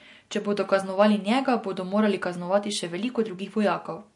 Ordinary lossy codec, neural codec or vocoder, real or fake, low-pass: MP3, 48 kbps; none; real; 10.8 kHz